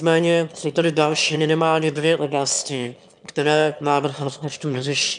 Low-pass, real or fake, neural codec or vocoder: 9.9 kHz; fake; autoencoder, 22.05 kHz, a latent of 192 numbers a frame, VITS, trained on one speaker